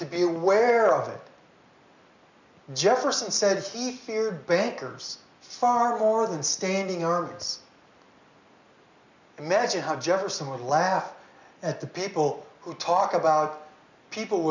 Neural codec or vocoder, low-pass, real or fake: none; 7.2 kHz; real